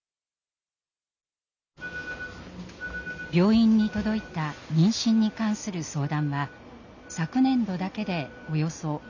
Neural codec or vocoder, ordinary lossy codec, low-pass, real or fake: none; none; 7.2 kHz; real